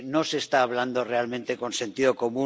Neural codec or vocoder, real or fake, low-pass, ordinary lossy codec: none; real; none; none